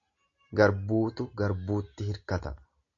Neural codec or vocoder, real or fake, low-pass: none; real; 7.2 kHz